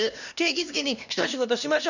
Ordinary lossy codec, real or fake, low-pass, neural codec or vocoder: none; fake; 7.2 kHz; codec, 16 kHz, 1 kbps, X-Codec, HuBERT features, trained on LibriSpeech